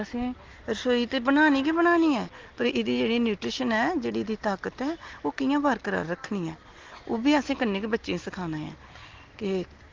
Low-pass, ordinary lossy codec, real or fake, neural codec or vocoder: 7.2 kHz; Opus, 16 kbps; real; none